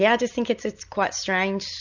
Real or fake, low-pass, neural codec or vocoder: real; 7.2 kHz; none